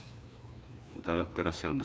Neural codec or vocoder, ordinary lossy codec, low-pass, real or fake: codec, 16 kHz, 2 kbps, FreqCodec, larger model; none; none; fake